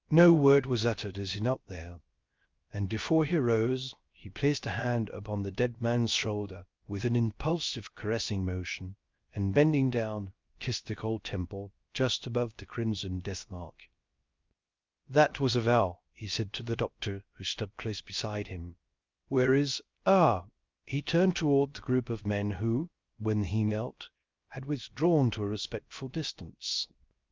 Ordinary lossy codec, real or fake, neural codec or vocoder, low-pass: Opus, 24 kbps; fake; codec, 16 kHz, 0.3 kbps, FocalCodec; 7.2 kHz